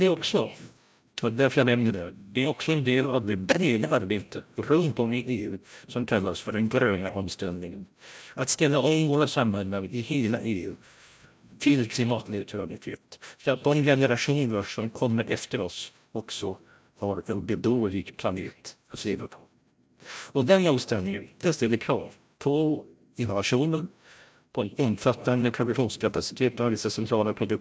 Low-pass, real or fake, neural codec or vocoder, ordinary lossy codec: none; fake; codec, 16 kHz, 0.5 kbps, FreqCodec, larger model; none